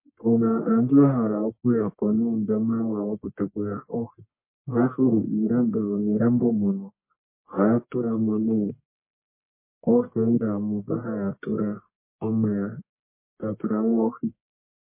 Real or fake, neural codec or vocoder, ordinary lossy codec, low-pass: fake; codec, 44.1 kHz, 1.7 kbps, Pupu-Codec; MP3, 24 kbps; 3.6 kHz